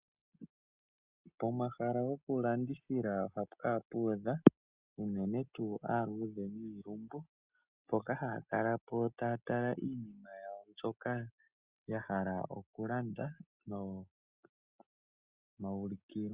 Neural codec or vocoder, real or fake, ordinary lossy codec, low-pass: none; real; Opus, 64 kbps; 3.6 kHz